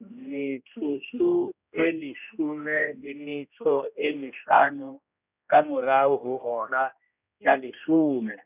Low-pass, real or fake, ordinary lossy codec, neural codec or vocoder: 3.6 kHz; fake; none; codec, 16 kHz, 1 kbps, X-Codec, HuBERT features, trained on general audio